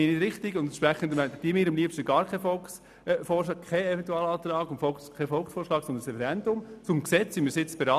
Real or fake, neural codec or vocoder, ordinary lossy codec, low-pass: real; none; none; 14.4 kHz